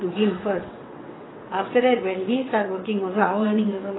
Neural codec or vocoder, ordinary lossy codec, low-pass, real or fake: vocoder, 22.05 kHz, 80 mel bands, WaveNeXt; AAC, 16 kbps; 7.2 kHz; fake